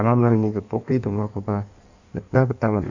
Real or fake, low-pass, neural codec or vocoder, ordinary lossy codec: fake; 7.2 kHz; codec, 16 kHz in and 24 kHz out, 1.1 kbps, FireRedTTS-2 codec; none